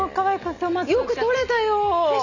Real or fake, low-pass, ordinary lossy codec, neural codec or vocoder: real; 7.2 kHz; none; none